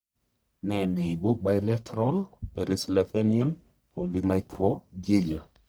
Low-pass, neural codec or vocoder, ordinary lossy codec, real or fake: none; codec, 44.1 kHz, 1.7 kbps, Pupu-Codec; none; fake